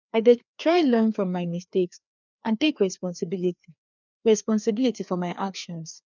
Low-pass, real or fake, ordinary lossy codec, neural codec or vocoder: 7.2 kHz; fake; none; codec, 16 kHz, 2 kbps, FreqCodec, larger model